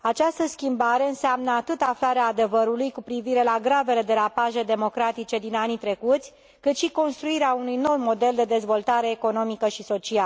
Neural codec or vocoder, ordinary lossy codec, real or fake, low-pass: none; none; real; none